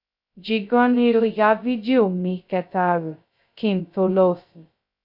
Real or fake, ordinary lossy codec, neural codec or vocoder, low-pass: fake; none; codec, 16 kHz, 0.2 kbps, FocalCodec; 5.4 kHz